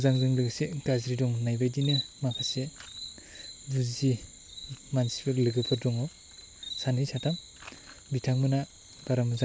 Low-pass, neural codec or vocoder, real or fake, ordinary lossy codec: none; none; real; none